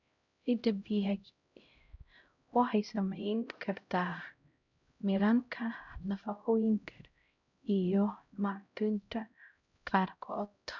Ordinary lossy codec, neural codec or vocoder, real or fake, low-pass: none; codec, 16 kHz, 0.5 kbps, X-Codec, HuBERT features, trained on LibriSpeech; fake; 7.2 kHz